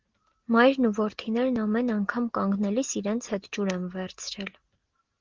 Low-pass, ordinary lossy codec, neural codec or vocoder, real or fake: 7.2 kHz; Opus, 16 kbps; none; real